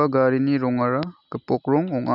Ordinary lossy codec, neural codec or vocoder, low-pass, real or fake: none; none; 5.4 kHz; real